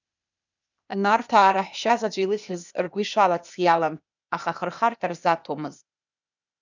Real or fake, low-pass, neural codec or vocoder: fake; 7.2 kHz; codec, 16 kHz, 0.8 kbps, ZipCodec